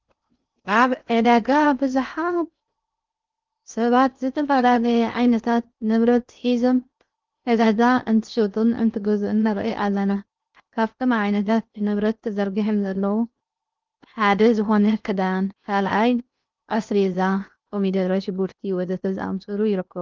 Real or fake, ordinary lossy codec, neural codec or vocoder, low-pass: fake; Opus, 24 kbps; codec, 16 kHz in and 24 kHz out, 0.6 kbps, FocalCodec, streaming, 2048 codes; 7.2 kHz